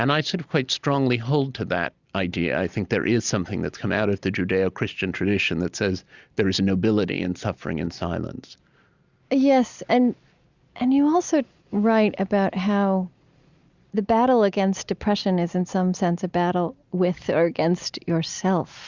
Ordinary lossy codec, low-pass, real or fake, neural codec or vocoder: Opus, 64 kbps; 7.2 kHz; real; none